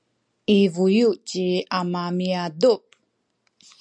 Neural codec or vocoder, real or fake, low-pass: none; real; 9.9 kHz